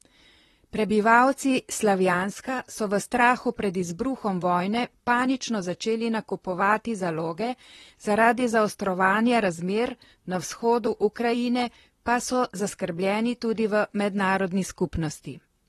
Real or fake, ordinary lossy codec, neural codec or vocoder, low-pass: fake; AAC, 32 kbps; vocoder, 44.1 kHz, 128 mel bands, Pupu-Vocoder; 19.8 kHz